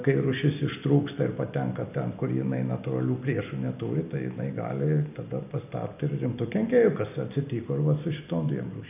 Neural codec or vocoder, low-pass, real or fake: none; 3.6 kHz; real